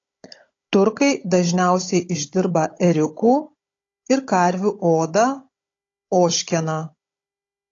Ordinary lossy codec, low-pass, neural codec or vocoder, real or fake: AAC, 32 kbps; 7.2 kHz; codec, 16 kHz, 16 kbps, FunCodec, trained on Chinese and English, 50 frames a second; fake